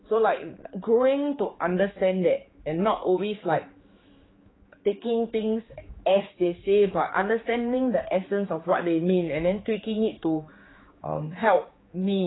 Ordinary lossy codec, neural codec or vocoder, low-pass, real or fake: AAC, 16 kbps; codec, 16 kHz, 4 kbps, X-Codec, HuBERT features, trained on general audio; 7.2 kHz; fake